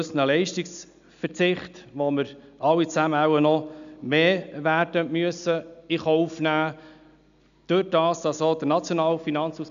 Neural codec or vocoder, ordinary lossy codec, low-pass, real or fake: none; MP3, 96 kbps; 7.2 kHz; real